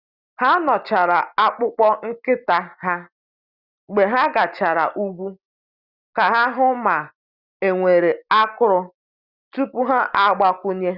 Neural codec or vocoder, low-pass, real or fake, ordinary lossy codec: none; 5.4 kHz; real; Opus, 64 kbps